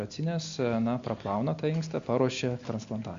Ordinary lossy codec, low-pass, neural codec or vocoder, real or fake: Opus, 64 kbps; 7.2 kHz; none; real